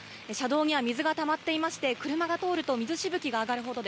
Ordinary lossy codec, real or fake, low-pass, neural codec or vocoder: none; real; none; none